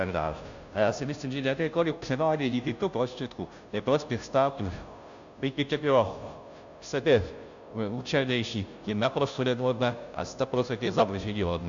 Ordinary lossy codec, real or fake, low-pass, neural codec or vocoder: AAC, 64 kbps; fake; 7.2 kHz; codec, 16 kHz, 0.5 kbps, FunCodec, trained on Chinese and English, 25 frames a second